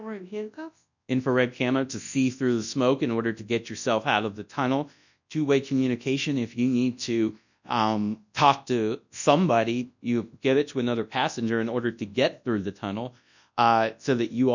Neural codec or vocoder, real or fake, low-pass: codec, 24 kHz, 0.9 kbps, WavTokenizer, large speech release; fake; 7.2 kHz